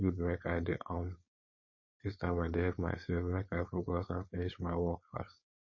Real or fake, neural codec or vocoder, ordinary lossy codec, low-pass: fake; codec, 16 kHz, 4.8 kbps, FACodec; MP3, 32 kbps; 7.2 kHz